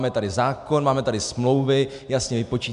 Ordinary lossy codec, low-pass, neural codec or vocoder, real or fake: MP3, 96 kbps; 10.8 kHz; none; real